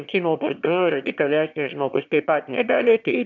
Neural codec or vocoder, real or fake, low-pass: autoencoder, 22.05 kHz, a latent of 192 numbers a frame, VITS, trained on one speaker; fake; 7.2 kHz